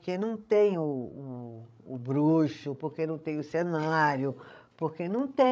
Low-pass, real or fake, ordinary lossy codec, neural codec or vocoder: none; fake; none; codec, 16 kHz, 16 kbps, FreqCodec, larger model